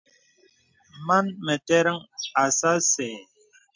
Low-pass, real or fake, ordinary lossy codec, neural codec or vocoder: 7.2 kHz; real; MP3, 64 kbps; none